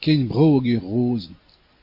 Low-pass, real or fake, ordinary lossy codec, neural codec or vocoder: 5.4 kHz; real; MP3, 32 kbps; none